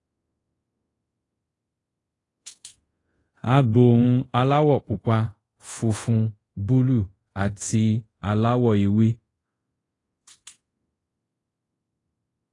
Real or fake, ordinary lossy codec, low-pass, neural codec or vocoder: fake; AAC, 32 kbps; 10.8 kHz; codec, 24 kHz, 0.5 kbps, DualCodec